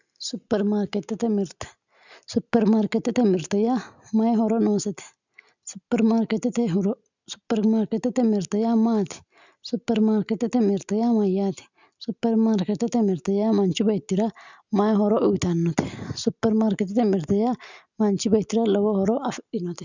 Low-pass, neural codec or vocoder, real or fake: 7.2 kHz; none; real